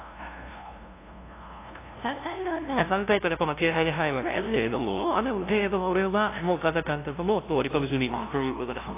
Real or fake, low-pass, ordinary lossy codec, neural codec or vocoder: fake; 3.6 kHz; AAC, 24 kbps; codec, 16 kHz, 0.5 kbps, FunCodec, trained on LibriTTS, 25 frames a second